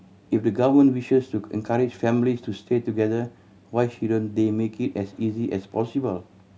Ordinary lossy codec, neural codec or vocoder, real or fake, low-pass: none; none; real; none